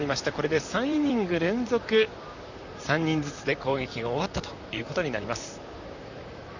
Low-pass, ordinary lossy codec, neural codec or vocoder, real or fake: 7.2 kHz; none; vocoder, 44.1 kHz, 128 mel bands, Pupu-Vocoder; fake